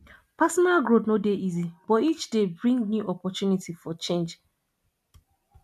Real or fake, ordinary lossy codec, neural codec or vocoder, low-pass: real; MP3, 96 kbps; none; 14.4 kHz